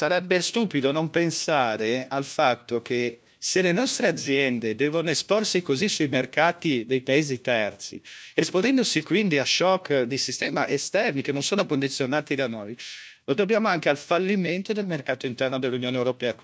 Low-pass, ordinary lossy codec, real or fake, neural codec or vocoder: none; none; fake; codec, 16 kHz, 1 kbps, FunCodec, trained on LibriTTS, 50 frames a second